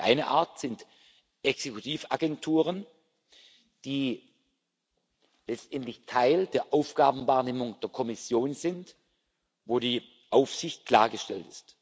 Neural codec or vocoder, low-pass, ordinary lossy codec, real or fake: none; none; none; real